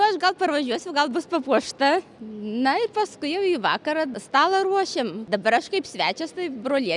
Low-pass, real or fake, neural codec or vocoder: 10.8 kHz; real; none